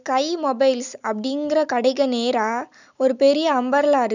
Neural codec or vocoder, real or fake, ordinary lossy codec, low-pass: none; real; none; 7.2 kHz